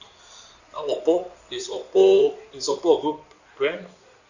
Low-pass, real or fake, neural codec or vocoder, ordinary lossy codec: 7.2 kHz; fake; codec, 16 kHz in and 24 kHz out, 2.2 kbps, FireRedTTS-2 codec; none